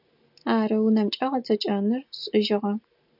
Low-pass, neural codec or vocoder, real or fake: 5.4 kHz; none; real